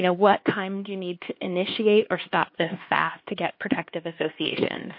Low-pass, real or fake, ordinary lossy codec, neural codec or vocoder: 5.4 kHz; fake; MP3, 32 kbps; codec, 16 kHz, 2 kbps, X-Codec, HuBERT features, trained on LibriSpeech